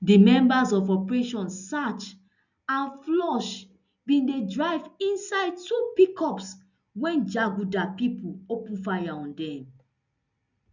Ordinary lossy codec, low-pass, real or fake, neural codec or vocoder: none; 7.2 kHz; real; none